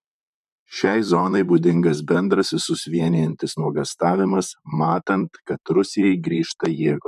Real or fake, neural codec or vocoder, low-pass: fake; vocoder, 44.1 kHz, 128 mel bands, Pupu-Vocoder; 14.4 kHz